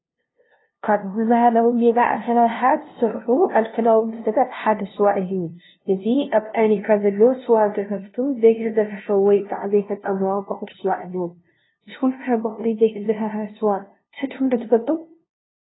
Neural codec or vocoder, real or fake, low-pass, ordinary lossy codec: codec, 16 kHz, 0.5 kbps, FunCodec, trained on LibriTTS, 25 frames a second; fake; 7.2 kHz; AAC, 16 kbps